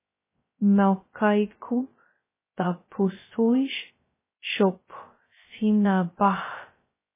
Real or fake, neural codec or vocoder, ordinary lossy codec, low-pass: fake; codec, 16 kHz, 0.2 kbps, FocalCodec; MP3, 16 kbps; 3.6 kHz